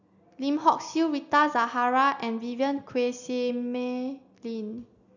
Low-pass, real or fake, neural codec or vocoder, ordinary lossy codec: 7.2 kHz; real; none; none